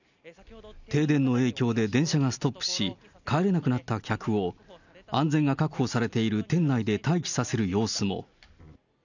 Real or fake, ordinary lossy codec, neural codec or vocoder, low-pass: real; none; none; 7.2 kHz